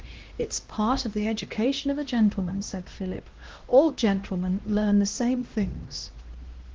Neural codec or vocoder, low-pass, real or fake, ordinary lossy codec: codec, 16 kHz, 1 kbps, X-Codec, HuBERT features, trained on LibriSpeech; 7.2 kHz; fake; Opus, 16 kbps